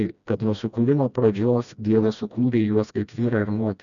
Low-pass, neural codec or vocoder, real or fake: 7.2 kHz; codec, 16 kHz, 1 kbps, FreqCodec, smaller model; fake